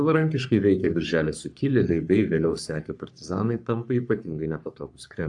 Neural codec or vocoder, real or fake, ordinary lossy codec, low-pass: codec, 44.1 kHz, 3.4 kbps, Pupu-Codec; fake; AAC, 64 kbps; 10.8 kHz